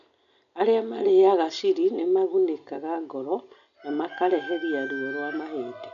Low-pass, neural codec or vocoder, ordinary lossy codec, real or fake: 7.2 kHz; none; none; real